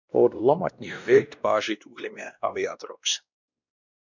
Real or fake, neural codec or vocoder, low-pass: fake; codec, 16 kHz, 1 kbps, X-Codec, WavLM features, trained on Multilingual LibriSpeech; 7.2 kHz